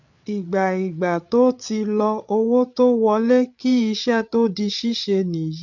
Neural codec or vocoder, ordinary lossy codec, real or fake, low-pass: codec, 16 kHz, 8 kbps, FreqCodec, larger model; none; fake; 7.2 kHz